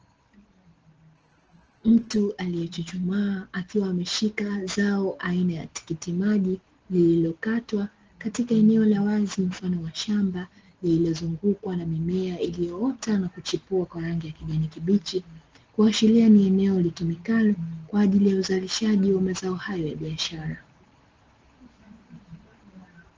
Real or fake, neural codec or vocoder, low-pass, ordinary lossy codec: real; none; 7.2 kHz; Opus, 16 kbps